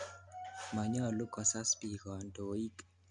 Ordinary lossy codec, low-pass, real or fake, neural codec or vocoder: none; 9.9 kHz; real; none